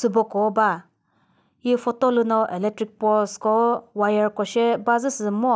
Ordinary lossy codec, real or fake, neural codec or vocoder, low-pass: none; real; none; none